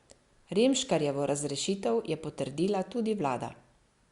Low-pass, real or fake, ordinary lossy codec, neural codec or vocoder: 10.8 kHz; real; Opus, 64 kbps; none